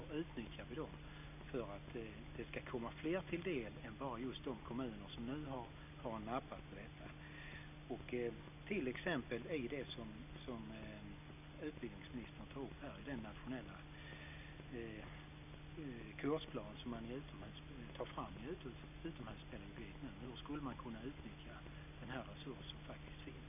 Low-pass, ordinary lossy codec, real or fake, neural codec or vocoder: 3.6 kHz; none; real; none